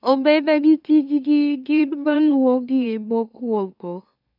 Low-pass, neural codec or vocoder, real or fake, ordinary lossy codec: 5.4 kHz; autoencoder, 44.1 kHz, a latent of 192 numbers a frame, MeloTTS; fake; none